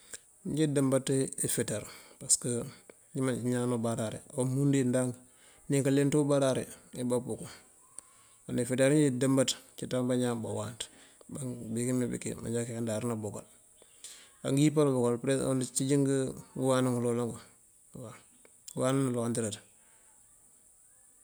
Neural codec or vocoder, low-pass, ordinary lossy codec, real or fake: none; none; none; real